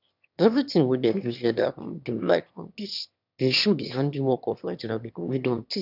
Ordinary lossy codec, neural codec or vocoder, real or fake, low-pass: none; autoencoder, 22.05 kHz, a latent of 192 numbers a frame, VITS, trained on one speaker; fake; 5.4 kHz